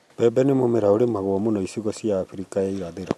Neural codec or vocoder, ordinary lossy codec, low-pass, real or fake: none; none; none; real